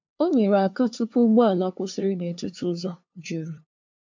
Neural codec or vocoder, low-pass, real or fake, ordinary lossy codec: codec, 16 kHz, 2 kbps, FunCodec, trained on LibriTTS, 25 frames a second; 7.2 kHz; fake; MP3, 64 kbps